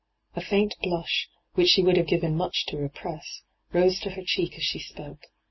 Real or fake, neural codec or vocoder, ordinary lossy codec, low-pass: real; none; MP3, 24 kbps; 7.2 kHz